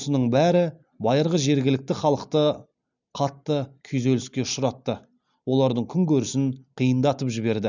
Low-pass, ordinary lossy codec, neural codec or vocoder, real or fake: 7.2 kHz; none; none; real